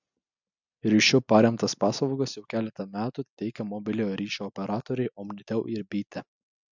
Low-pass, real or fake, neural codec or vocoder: 7.2 kHz; real; none